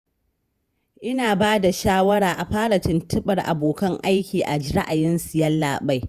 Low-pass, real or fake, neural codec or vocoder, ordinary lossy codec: 14.4 kHz; fake; vocoder, 48 kHz, 128 mel bands, Vocos; Opus, 64 kbps